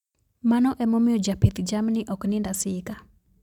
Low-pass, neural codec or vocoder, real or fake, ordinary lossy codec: 19.8 kHz; none; real; none